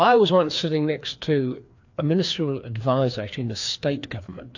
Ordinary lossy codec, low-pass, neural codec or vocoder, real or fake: AAC, 48 kbps; 7.2 kHz; codec, 16 kHz, 2 kbps, FreqCodec, larger model; fake